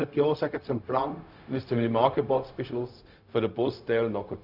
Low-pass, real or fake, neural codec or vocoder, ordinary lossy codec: 5.4 kHz; fake; codec, 16 kHz, 0.4 kbps, LongCat-Audio-Codec; none